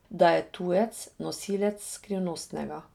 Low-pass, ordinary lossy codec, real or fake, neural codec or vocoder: 19.8 kHz; none; real; none